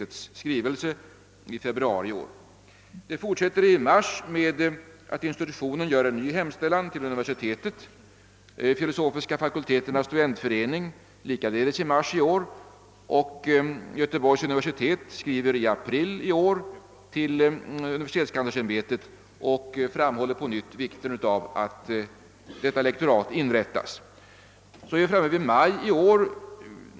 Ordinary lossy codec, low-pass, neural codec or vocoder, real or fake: none; none; none; real